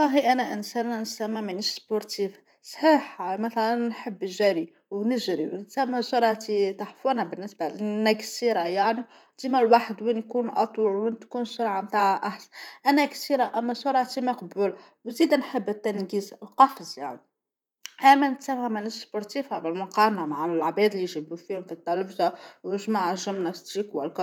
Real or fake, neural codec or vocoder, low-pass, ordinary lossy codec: fake; vocoder, 44.1 kHz, 128 mel bands, Pupu-Vocoder; 19.8 kHz; none